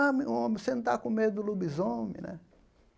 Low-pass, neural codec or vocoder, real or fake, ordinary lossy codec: none; none; real; none